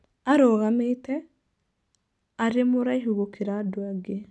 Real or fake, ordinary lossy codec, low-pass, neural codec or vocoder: real; none; none; none